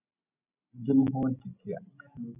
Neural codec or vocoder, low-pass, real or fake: none; 3.6 kHz; real